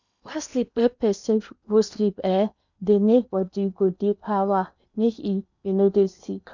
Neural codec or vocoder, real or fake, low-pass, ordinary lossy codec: codec, 16 kHz in and 24 kHz out, 0.8 kbps, FocalCodec, streaming, 65536 codes; fake; 7.2 kHz; none